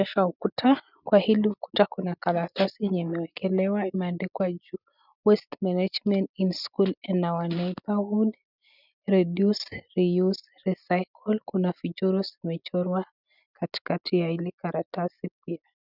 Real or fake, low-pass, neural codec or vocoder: real; 5.4 kHz; none